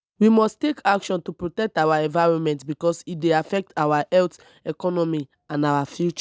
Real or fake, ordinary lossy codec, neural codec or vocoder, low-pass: real; none; none; none